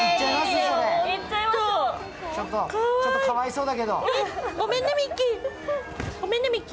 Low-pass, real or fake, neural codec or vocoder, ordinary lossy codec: none; real; none; none